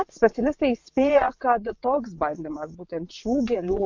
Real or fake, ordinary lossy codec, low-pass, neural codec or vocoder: fake; MP3, 48 kbps; 7.2 kHz; vocoder, 22.05 kHz, 80 mel bands, WaveNeXt